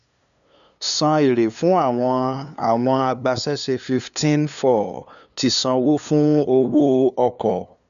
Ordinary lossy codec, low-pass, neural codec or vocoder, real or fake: none; 7.2 kHz; codec, 16 kHz, 2 kbps, FunCodec, trained on LibriTTS, 25 frames a second; fake